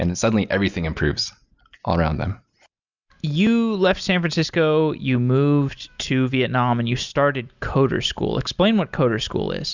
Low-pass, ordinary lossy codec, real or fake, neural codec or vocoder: 7.2 kHz; Opus, 64 kbps; real; none